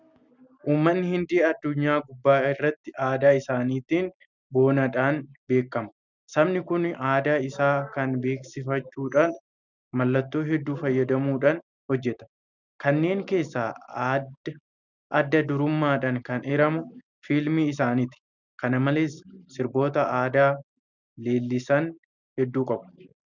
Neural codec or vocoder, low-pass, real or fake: none; 7.2 kHz; real